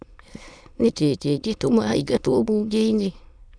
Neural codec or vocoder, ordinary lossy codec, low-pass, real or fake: autoencoder, 22.05 kHz, a latent of 192 numbers a frame, VITS, trained on many speakers; none; 9.9 kHz; fake